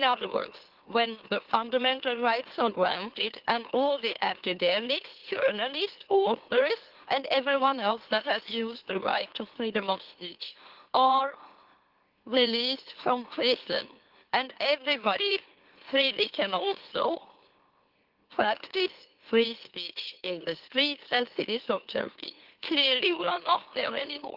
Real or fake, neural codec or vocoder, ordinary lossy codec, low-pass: fake; autoencoder, 44.1 kHz, a latent of 192 numbers a frame, MeloTTS; Opus, 16 kbps; 5.4 kHz